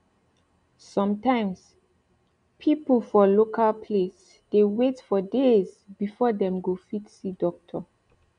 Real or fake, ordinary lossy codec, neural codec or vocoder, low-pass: real; none; none; 9.9 kHz